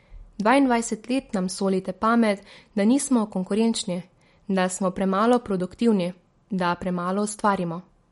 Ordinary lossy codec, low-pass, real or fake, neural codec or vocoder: MP3, 48 kbps; 19.8 kHz; real; none